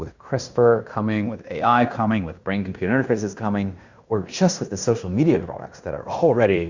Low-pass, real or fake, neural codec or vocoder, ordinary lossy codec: 7.2 kHz; fake; codec, 16 kHz in and 24 kHz out, 0.9 kbps, LongCat-Audio-Codec, fine tuned four codebook decoder; Opus, 64 kbps